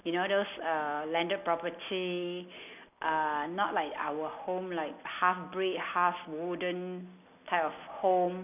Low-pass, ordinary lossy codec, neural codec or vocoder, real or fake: 3.6 kHz; none; none; real